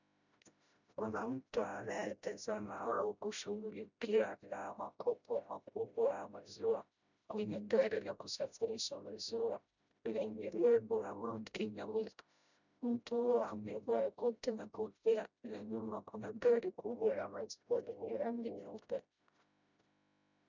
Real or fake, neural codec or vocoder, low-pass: fake; codec, 16 kHz, 0.5 kbps, FreqCodec, smaller model; 7.2 kHz